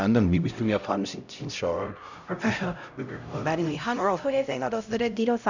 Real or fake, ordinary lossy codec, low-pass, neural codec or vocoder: fake; none; 7.2 kHz; codec, 16 kHz, 0.5 kbps, X-Codec, HuBERT features, trained on LibriSpeech